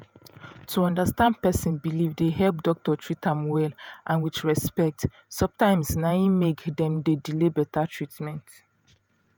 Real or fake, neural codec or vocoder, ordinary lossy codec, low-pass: fake; vocoder, 48 kHz, 128 mel bands, Vocos; none; none